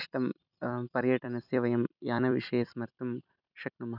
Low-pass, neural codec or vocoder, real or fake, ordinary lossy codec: 5.4 kHz; vocoder, 44.1 kHz, 128 mel bands every 256 samples, BigVGAN v2; fake; none